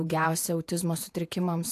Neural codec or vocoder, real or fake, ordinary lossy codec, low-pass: vocoder, 48 kHz, 128 mel bands, Vocos; fake; AAC, 64 kbps; 14.4 kHz